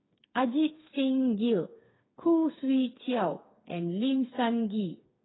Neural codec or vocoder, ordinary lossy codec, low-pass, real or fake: codec, 16 kHz, 4 kbps, FreqCodec, smaller model; AAC, 16 kbps; 7.2 kHz; fake